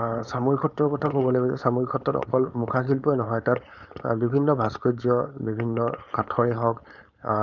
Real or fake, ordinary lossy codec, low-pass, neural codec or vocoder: fake; none; 7.2 kHz; codec, 16 kHz, 4.8 kbps, FACodec